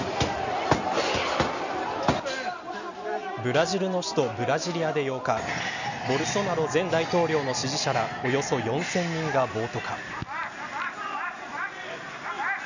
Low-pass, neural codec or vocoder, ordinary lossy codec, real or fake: 7.2 kHz; none; none; real